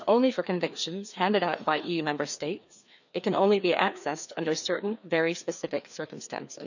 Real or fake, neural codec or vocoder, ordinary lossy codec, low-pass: fake; codec, 16 kHz, 2 kbps, FreqCodec, larger model; none; 7.2 kHz